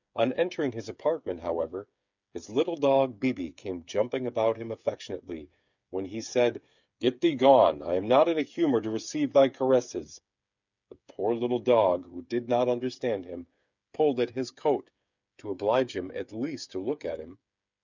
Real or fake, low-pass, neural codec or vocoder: fake; 7.2 kHz; codec, 16 kHz, 8 kbps, FreqCodec, smaller model